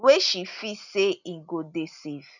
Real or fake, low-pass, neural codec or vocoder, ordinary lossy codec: real; 7.2 kHz; none; none